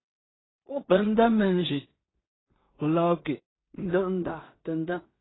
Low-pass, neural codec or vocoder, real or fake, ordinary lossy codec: 7.2 kHz; codec, 16 kHz in and 24 kHz out, 0.4 kbps, LongCat-Audio-Codec, two codebook decoder; fake; AAC, 16 kbps